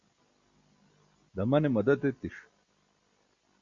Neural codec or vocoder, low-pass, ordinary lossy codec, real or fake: none; 7.2 kHz; Opus, 64 kbps; real